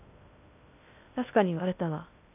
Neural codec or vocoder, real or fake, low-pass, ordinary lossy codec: codec, 16 kHz in and 24 kHz out, 0.6 kbps, FocalCodec, streaming, 2048 codes; fake; 3.6 kHz; none